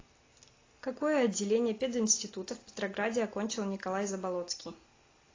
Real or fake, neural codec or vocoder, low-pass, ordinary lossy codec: real; none; 7.2 kHz; AAC, 32 kbps